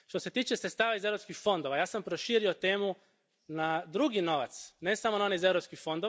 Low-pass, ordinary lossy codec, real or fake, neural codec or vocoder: none; none; real; none